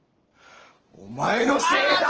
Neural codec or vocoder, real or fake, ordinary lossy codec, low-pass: none; real; Opus, 16 kbps; 7.2 kHz